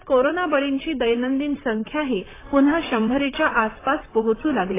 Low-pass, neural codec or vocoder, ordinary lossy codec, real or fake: 3.6 kHz; vocoder, 44.1 kHz, 128 mel bands, Pupu-Vocoder; AAC, 16 kbps; fake